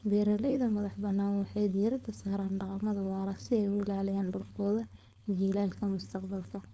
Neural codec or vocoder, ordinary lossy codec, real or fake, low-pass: codec, 16 kHz, 4.8 kbps, FACodec; none; fake; none